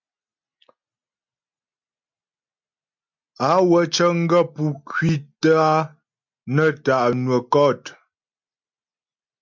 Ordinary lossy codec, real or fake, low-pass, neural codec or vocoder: MP3, 64 kbps; real; 7.2 kHz; none